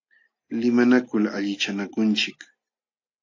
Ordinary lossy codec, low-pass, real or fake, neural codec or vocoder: AAC, 48 kbps; 7.2 kHz; real; none